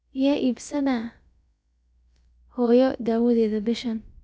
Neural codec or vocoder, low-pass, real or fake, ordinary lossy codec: codec, 16 kHz, about 1 kbps, DyCAST, with the encoder's durations; none; fake; none